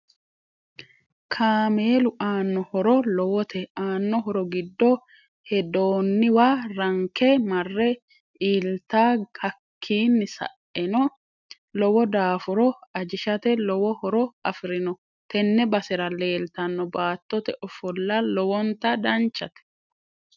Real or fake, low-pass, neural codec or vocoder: real; 7.2 kHz; none